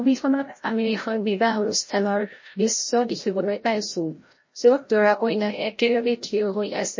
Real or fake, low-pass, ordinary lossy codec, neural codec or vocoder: fake; 7.2 kHz; MP3, 32 kbps; codec, 16 kHz, 0.5 kbps, FreqCodec, larger model